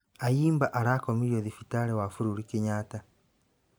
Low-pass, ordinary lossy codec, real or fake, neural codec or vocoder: none; none; fake; vocoder, 44.1 kHz, 128 mel bands every 512 samples, BigVGAN v2